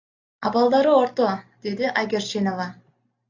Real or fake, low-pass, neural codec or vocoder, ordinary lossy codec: real; 7.2 kHz; none; AAC, 48 kbps